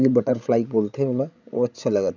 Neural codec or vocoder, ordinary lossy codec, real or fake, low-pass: codec, 16 kHz, 16 kbps, FreqCodec, larger model; none; fake; 7.2 kHz